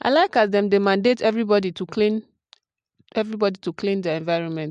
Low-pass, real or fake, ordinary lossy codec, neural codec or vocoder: 9.9 kHz; real; MP3, 64 kbps; none